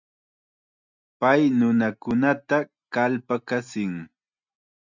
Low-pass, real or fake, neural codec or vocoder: 7.2 kHz; real; none